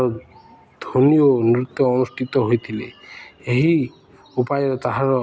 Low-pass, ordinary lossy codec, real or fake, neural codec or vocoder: none; none; real; none